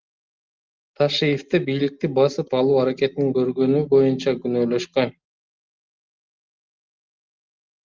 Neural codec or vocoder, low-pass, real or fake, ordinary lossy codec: none; 7.2 kHz; real; Opus, 32 kbps